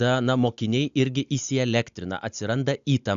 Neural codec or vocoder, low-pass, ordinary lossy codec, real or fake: none; 7.2 kHz; AAC, 96 kbps; real